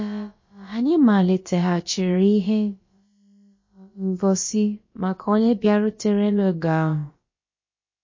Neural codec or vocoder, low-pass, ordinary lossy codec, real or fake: codec, 16 kHz, about 1 kbps, DyCAST, with the encoder's durations; 7.2 kHz; MP3, 32 kbps; fake